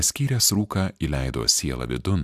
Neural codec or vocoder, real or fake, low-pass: none; real; 14.4 kHz